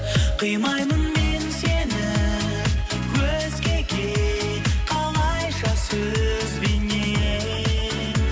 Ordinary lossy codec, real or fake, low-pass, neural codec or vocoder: none; real; none; none